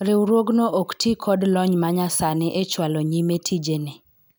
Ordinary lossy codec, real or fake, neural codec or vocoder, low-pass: none; real; none; none